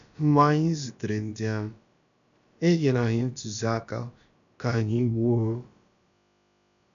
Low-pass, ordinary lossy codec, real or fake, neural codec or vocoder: 7.2 kHz; none; fake; codec, 16 kHz, about 1 kbps, DyCAST, with the encoder's durations